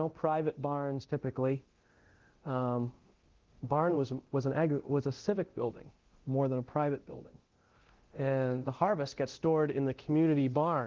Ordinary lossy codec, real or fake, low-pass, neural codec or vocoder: Opus, 16 kbps; fake; 7.2 kHz; codec, 24 kHz, 0.9 kbps, DualCodec